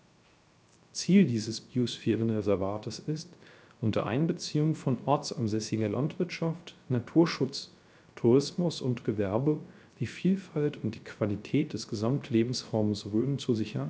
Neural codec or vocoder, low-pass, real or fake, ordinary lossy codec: codec, 16 kHz, 0.3 kbps, FocalCodec; none; fake; none